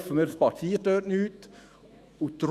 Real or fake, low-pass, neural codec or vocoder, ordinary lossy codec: fake; 14.4 kHz; autoencoder, 48 kHz, 128 numbers a frame, DAC-VAE, trained on Japanese speech; Opus, 64 kbps